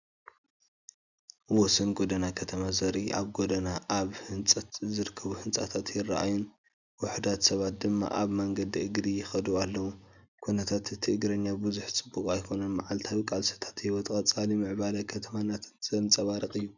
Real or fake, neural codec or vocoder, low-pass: real; none; 7.2 kHz